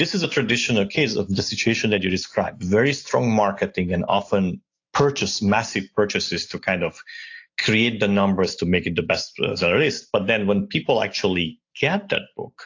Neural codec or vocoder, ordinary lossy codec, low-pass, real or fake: none; AAC, 48 kbps; 7.2 kHz; real